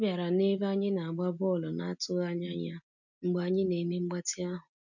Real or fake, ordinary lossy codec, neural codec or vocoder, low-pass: real; none; none; 7.2 kHz